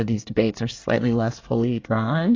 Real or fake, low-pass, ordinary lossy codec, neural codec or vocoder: fake; 7.2 kHz; AAC, 32 kbps; codec, 24 kHz, 1 kbps, SNAC